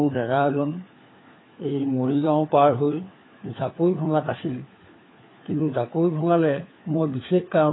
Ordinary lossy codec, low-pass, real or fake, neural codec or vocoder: AAC, 16 kbps; 7.2 kHz; fake; codec, 16 kHz, 4 kbps, FunCodec, trained on LibriTTS, 50 frames a second